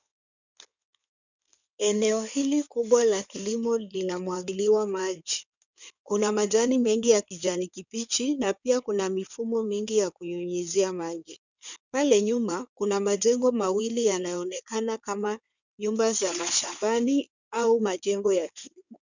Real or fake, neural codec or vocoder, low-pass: fake; codec, 16 kHz in and 24 kHz out, 2.2 kbps, FireRedTTS-2 codec; 7.2 kHz